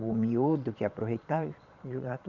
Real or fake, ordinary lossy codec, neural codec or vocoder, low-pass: fake; none; vocoder, 22.05 kHz, 80 mel bands, Vocos; 7.2 kHz